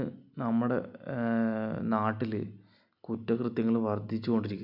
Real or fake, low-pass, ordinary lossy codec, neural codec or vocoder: real; 5.4 kHz; none; none